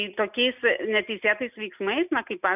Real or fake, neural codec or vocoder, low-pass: real; none; 3.6 kHz